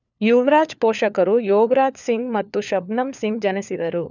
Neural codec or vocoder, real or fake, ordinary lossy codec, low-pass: codec, 16 kHz, 4 kbps, FunCodec, trained on LibriTTS, 50 frames a second; fake; none; 7.2 kHz